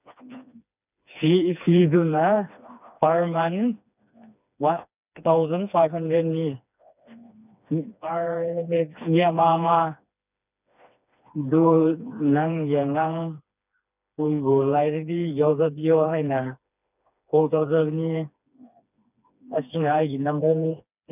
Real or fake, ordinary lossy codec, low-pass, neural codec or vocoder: fake; none; 3.6 kHz; codec, 16 kHz, 2 kbps, FreqCodec, smaller model